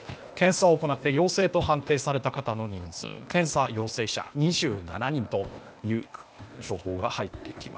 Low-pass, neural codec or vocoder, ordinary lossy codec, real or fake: none; codec, 16 kHz, 0.8 kbps, ZipCodec; none; fake